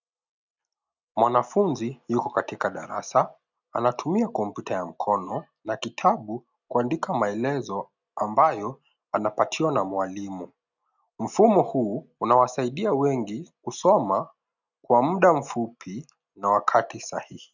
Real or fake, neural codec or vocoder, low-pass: real; none; 7.2 kHz